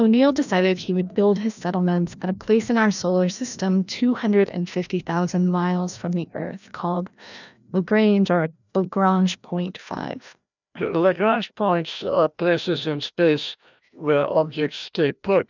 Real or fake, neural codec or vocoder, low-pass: fake; codec, 16 kHz, 1 kbps, FreqCodec, larger model; 7.2 kHz